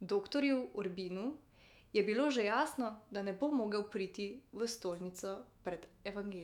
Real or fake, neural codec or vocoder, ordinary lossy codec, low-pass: fake; autoencoder, 48 kHz, 128 numbers a frame, DAC-VAE, trained on Japanese speech; none; 19.8 kHz